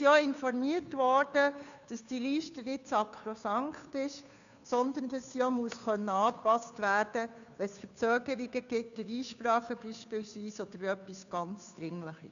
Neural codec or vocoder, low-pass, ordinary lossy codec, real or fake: codec, 16 kHz, 2 kbps, FunCodec, trained on Chinese and English, 25 frames a second; 7.2 kHz; none; fake